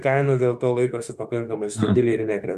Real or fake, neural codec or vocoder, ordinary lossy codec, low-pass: fake; autoencoder, 48 kHz, 32 numbers a frame, DAC-VAE, trained on Japanese speech; Opus, 64 kbps; 14.4 kHz